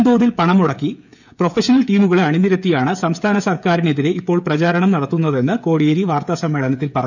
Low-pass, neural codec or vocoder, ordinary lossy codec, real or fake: 7.2 kHz; codec, 16 kHz, 16 kbps, FreqCodec, smaller model; none; fake